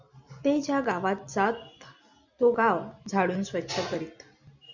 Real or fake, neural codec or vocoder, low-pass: real; none; 7.2 kHz